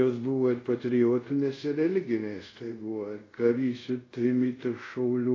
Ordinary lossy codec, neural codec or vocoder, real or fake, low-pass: AAC, 32 kbps; codec, 24 kHz, 0.5 kbps, DualCodec; fake; 7.2 kHz